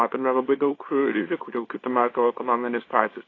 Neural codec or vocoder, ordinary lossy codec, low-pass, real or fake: codec, 24 kHz, 0.9 kbps, WavTokenizer, small release; AAC, 32 kbps; 7.2 kHz; fake